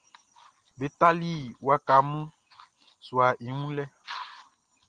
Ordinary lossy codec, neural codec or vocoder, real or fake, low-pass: Opus, 24 kbps; none; real; 9.9 kHz